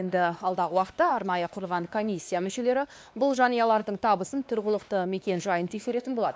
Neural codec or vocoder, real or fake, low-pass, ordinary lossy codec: codec, 16 kHz, 2 kbps, X-Codec, WavLM features, trained on Multilingual LibriSpeech; fake; none; none